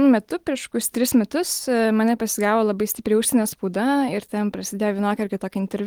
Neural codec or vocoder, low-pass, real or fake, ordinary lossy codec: none; 19.8 kHz; real; Opus, 24 kbps